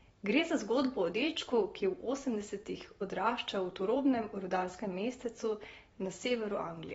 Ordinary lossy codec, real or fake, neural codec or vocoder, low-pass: AAC, 24 kbps; fake; vocoder, 44.1 kHz, 128 mel bands every 512 samples, BigVGAN v2; 19.8 kHz